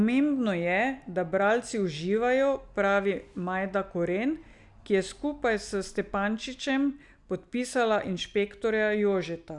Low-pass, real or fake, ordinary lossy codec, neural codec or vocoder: 10.8 kHz; real; none; none